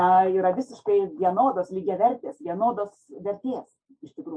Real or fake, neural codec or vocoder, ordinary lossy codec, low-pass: real; none; MP3, 48 kbps; 9.9 kHz